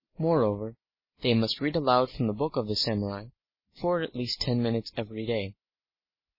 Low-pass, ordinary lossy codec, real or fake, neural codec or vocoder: 5.4 kHz; MP3, 24 kbps; real; none